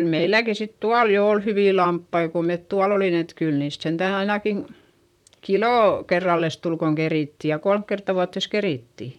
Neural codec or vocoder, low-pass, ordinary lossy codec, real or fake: vocoder, 44.1 kHz, 128 mel bands, Pupu-Vocoder; 19.8 kHz; none; fake